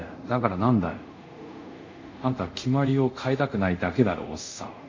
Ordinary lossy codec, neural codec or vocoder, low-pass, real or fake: MP3, 48 kbps; codec, 24 kHz, 0.5 kbps, DualCodec; 7.2 kHz; fake